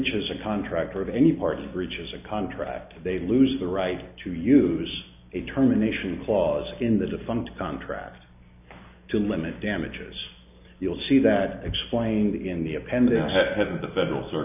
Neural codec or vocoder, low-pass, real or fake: none; 3.6 kHz; real